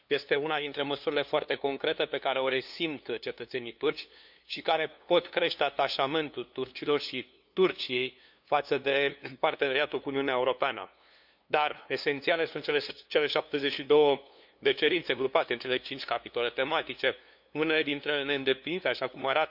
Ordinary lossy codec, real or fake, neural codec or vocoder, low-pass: none; fake; codec, 16 kHz, 2 kbps, FunCodec, trained on LibriTTS, 25 frames a second; 5.4 kHz